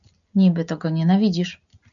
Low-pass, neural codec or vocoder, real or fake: 7.2 kHz; none; real